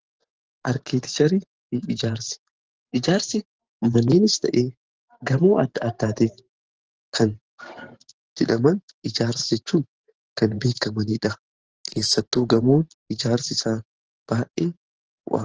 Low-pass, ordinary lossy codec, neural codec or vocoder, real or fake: 7.2 kHz; Opus, 16 kbps; vocoder, 44.1 kHz, 128 mel bands every 512 samples, BigVGAN v2; fake